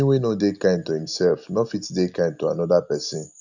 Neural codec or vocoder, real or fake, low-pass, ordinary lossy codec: none; real; 7.2 kHz; none